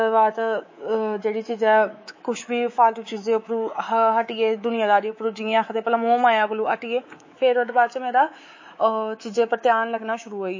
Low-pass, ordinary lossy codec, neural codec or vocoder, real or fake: 7.2 kHz; MP3, 32 kbps; codec, 24 kHz, 3.1 kbps, DualCodec; fake